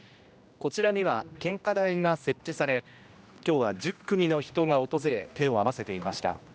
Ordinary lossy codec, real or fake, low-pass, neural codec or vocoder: none; fake; none; codec, 16 kHz, 1 kbps, X-Codec, HuBERT features, trained on general audio